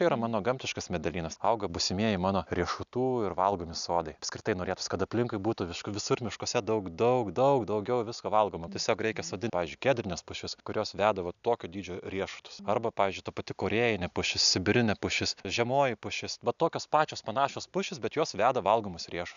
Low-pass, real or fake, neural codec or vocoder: 7.2 kHz; real; none